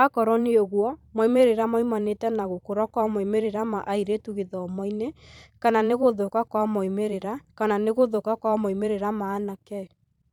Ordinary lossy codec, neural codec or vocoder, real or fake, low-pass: none; vocoder, 44.1 kHz, 128 mel bands every 512 samples, BigVGAN v2; fake; 19.8 kHz